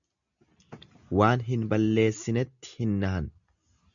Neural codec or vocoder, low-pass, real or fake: none; 7.2 kHz; real